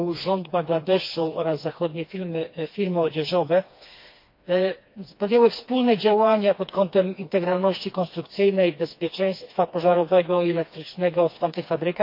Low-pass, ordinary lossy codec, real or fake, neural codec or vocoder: 5.4 kHz; MP3, 32 kbps; fake; codec, 16 kHz, 2 kbps, FreqCodec, smaller model